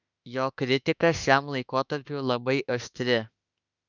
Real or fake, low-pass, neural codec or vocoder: fake; 7.2 kHz; autoencoder, 48 kHz, 32 numbers a frame, DAC-VAE, trained on Japanese speech